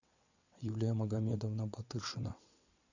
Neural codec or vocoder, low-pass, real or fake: vocoder, 22.05 kHz, 80 mel bands, WaveNeXt; 7.2 kHz; fake